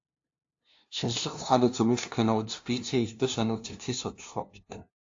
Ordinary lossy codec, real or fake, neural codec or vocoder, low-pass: AAC, 48 kbps; fake; codec, 16 kHz, 0.5 kbps, FunCodec, trained on LibriTTS, 25 frames a second; 7.2 kHz